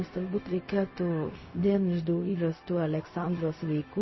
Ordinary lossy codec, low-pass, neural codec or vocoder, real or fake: MP3, 24 kbps; 7.2 kHz; codec, 16 kHz, 0.4 kbps, LongCat-Audio-Codec; fake